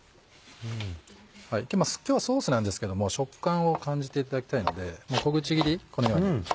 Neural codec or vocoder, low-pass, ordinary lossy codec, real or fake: none; none; none; real